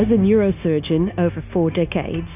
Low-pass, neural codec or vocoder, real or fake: 3.6 kHz; codec, 16 kHz, 0.9 kbps, LongCat-Audio-Codec; fake